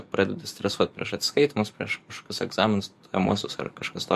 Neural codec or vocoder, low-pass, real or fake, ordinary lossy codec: codec, 44.1 kHz, 7.8 kbps, DAC; 14.4 kHz; fake; MP3, 64 kbps